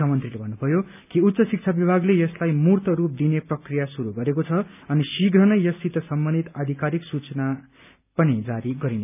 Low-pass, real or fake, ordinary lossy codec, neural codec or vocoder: 3.6 kHz; real; none; none